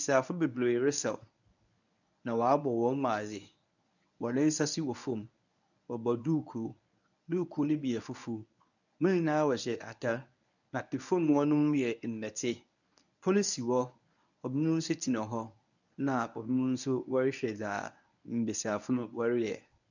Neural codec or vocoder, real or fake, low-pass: codec, 24 kHz, 0.9 kbps, WavTokenizer, medium speech release version 1; fake; 7.2 kHz